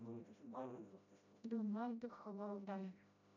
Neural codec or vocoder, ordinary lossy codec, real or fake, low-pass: codec, 16 kHz, 0.5 kbps, FreqCodec, smaller model; none; fake; 7.2 kHz